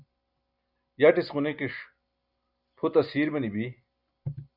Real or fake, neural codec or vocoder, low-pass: real; none; 5.4 kHz